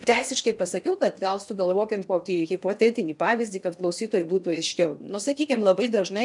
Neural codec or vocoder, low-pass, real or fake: codec, 16 kHz in and 24 kHz out, 0.8 kbps, FocalCodec, streaming, 65536 codes; 10.8 kHz; fake